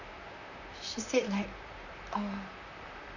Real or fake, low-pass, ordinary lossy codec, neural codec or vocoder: fake; 7.2 kHz; none; vocoder, 44.1 kHz, 128 mel bands, Pupu-Vocoder